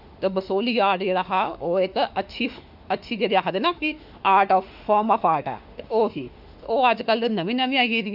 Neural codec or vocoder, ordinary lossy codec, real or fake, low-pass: autoencoder, 48 kHz, 32 numbers a frame, DAC-VAE, trained on Japanese speech; Opus, 64 kbps; fake; 5.4 kHz